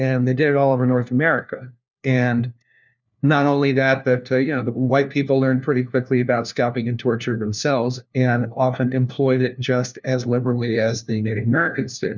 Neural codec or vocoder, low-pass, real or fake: codec, 16 kHz, 1 kbps, FunCodec, trained on LibriTTS, 50 frames a second; 7.2 kHz; fake